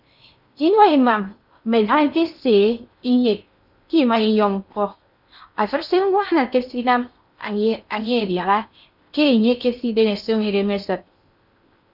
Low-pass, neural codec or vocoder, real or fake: 5.4 kHz; codec, 16 kHz in and 24 kHz out, 0.6 kbps, FocalCodec, streaming, 4096 codes; fake